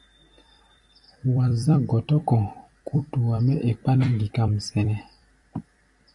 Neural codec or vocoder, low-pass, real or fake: vocoder, 44.1 kHz, 128 mel bands every 256 samples, BigVGAN v2; 10.8 kHz; fake